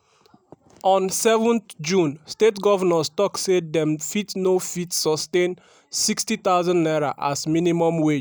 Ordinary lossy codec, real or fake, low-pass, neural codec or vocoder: none; real; none; none